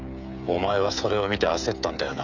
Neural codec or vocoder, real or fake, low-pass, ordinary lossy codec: codec, 16 kHz, 16 kbps, FreqCodec, smaller model; fake; 7.2 kHz; none